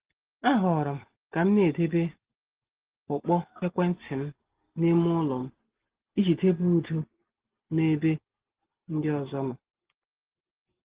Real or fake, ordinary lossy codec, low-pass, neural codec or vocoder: real; Opus, 16 kbps; 3.6 kHz; none